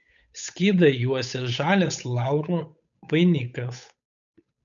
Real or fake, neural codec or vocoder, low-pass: fake; codec, 16 kHz, 8 kbps, FunCodec, trained on Chinese and English, 25 frames a second; 7.2 kHz